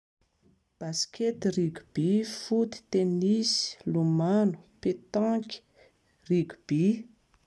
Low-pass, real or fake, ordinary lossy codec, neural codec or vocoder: none; real; none; none